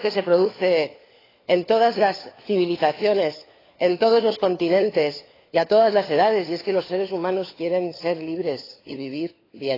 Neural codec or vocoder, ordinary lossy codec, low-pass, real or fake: codec, 16 kHz, 4 kbps, FunCodec, trained on LibriTTS, 50 frames a second; AAC, 24 kbps; 5.4 kHz; fake